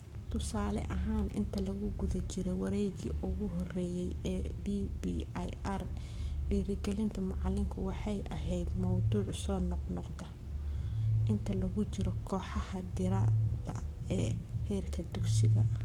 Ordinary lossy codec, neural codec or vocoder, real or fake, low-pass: MP3, 96 kbps; codec, 44.1 kHz, 7.8 kbps, Pupu-Codec; fake; 19.8 kHz